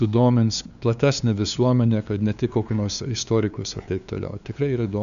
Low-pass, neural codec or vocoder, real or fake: 7.2 kHz; codec, 16 kHz, 2 kbps, FunCodec, trained on LibriTTS, 25 frames a second; fake